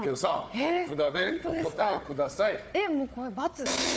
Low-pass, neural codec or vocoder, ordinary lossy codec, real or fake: none; codec, 16 kHz, 4 kbps, FunCodec, trained on Chinese and English, 50 frames a second; none; fake